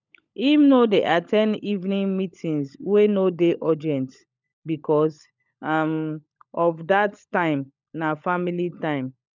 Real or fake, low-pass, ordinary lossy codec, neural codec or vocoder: fake; 7.2 kHz; none; codec, 16 kHz, 16 kbps, FunCodec, trained on LibriTTS, 50 frames a second